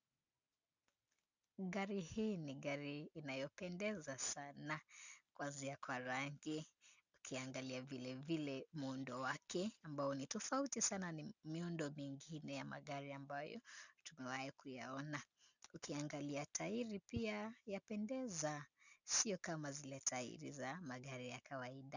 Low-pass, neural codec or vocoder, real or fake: 7.2 kHz; none; real